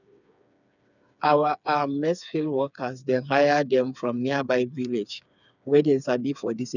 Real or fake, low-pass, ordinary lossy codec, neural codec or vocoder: fake; 7.2 kHz; none; codec, 16 kHz, 4 kbps, FreqCodec, smaller model